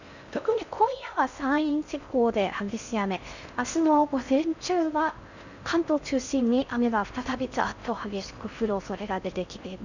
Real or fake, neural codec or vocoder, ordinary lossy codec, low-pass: fake; codec, 16 kHz in and 24 kHz out, 0.6 kbps, FocalCodec, streaming, 4096 codes; none; 7.2 kHz